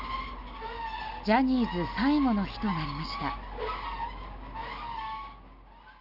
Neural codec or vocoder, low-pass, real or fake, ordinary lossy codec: none; 5.4 kHz; real; none